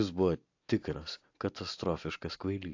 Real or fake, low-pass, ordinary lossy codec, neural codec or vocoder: real; 7.2 kHz; AAC, 48 kbps; none